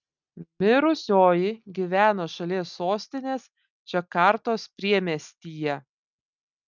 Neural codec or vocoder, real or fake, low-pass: none; real; 7.2 kHz